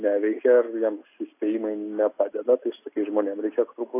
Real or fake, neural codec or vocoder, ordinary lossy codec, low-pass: real; none; AAC, 24 kbps; 3.6 kHz